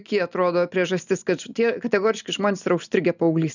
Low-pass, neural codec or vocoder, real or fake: 7.2 kHz; none; real